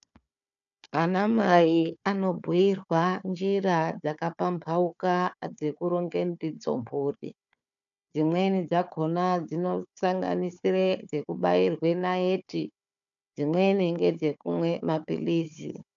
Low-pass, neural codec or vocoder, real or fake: 7.2 kHz; codec, 16 kHz, 4 kbps, FunCodec, trained on Chinese and English, 50 frames a second; fake